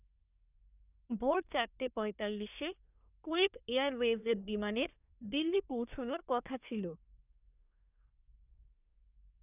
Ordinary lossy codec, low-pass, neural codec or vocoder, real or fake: none; 3.6 kHz; codec, 24 kHz, 1 kbps, SNAC; fake